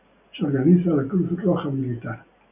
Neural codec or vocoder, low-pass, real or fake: none; 3.6 kHz; real